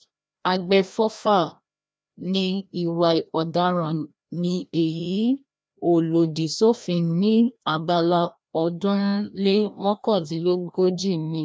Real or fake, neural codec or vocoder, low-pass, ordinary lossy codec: fake; codec, 16 kHz, 1 kbps, FreqCodec, larger model; none; none